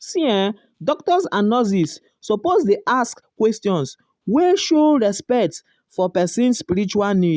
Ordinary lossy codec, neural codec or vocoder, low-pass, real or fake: none; none; none; real